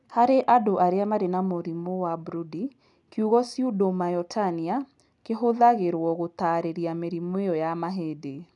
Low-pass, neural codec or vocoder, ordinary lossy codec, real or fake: 10.8 kHz; none; none; real